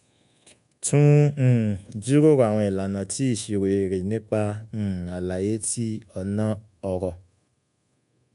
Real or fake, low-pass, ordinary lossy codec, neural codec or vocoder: fake; 10.8 kHz; MP3, 96 kbps; codec, 24 kHz, 1.2 kbps, DualCodec